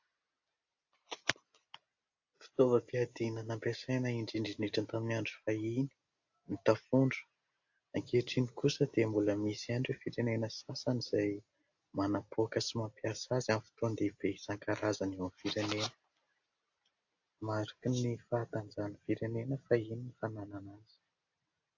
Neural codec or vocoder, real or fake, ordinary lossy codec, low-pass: none; real; AAC, 48 kbps; 7.2 kHz